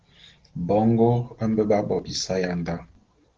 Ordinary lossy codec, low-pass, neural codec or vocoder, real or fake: Opus, 16 kbps; 7.2 kHz; none; real